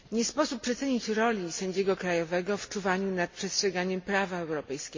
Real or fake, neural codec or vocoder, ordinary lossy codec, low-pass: real; none; MP3, 32 kbps; 7.2 kHz